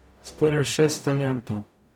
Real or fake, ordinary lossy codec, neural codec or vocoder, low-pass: fake; none; codec, 44.1 kHz, 0.9 kbps, DAC; 19.8 kHz